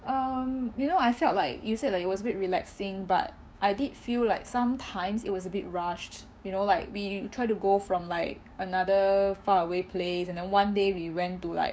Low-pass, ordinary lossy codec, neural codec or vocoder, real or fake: none; none; codec, 16 kHz, 6 kbps, DAC; fake